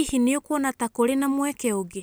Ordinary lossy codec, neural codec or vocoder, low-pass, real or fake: none; none; none; real